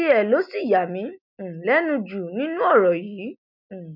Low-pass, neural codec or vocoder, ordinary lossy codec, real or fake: 5.4 kHz; none; none; real